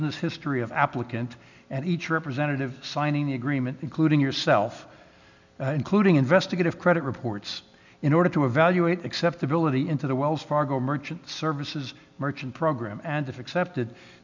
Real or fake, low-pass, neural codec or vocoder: real; 7.2 kHz; none